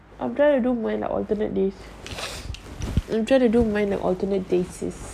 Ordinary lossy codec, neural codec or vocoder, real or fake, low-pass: none; none; real; 14.4 kHz